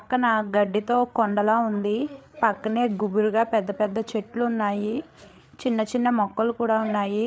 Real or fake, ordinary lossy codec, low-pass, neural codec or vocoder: fake; none; none; codec, 16 kHz, 8 kbps, FreqCodec, larger model